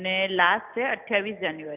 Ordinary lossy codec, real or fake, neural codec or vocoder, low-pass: none; real; none; 3.6 kHz